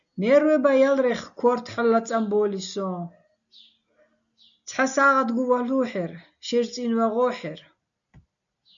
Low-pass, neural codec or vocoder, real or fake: 7.2 kHz; none; real